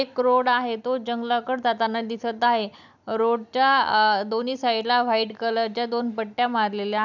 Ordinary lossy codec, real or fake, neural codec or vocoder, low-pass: none; real; none; 7.2 kHz